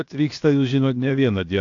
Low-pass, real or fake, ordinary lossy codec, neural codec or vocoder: 7.2 kHz; fake; AAC, 48 kbps; codec, 16 kHz, 0.7 kbps, FocalCodec